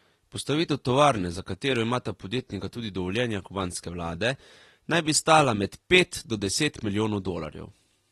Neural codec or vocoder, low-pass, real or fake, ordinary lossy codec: none; 19.8 kHz; real; AAC, 32 kbps